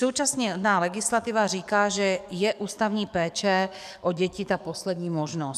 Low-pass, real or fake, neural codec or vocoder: 14.4 kHz; fake; codec, 44.1 kHz, 7.8 kbps, DAC